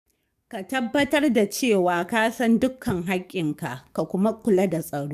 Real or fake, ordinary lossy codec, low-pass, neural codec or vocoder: fake; none; 14.4 kHz; codec, 44.1 kHz, 7.8 kbps, DAC